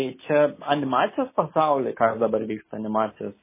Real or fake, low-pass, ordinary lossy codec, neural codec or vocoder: real; 3.6 kHz; MP3, 16 kbps; none